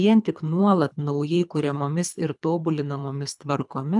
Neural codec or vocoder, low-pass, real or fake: codec, 24 kHz, 3 kbps, HILCodec; 10.8 kHz; fake